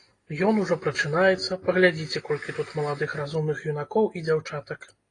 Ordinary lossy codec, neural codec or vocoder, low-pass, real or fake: AAC, 32 kbps; none; 10.8 kHz; real